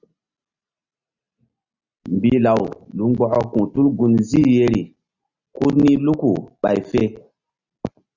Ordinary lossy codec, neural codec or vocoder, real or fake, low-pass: Opus, 64 kbps; none; real; 7.2 kHz